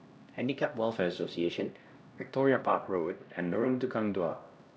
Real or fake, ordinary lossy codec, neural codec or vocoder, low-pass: fake; none; codec, 16 kHz, 1 kbps, X-Codec, HuBERT features, trained on LibriSpeech; none